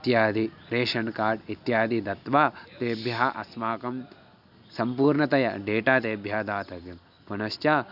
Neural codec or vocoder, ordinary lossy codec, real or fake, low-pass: none; none; real; 5.4 kHz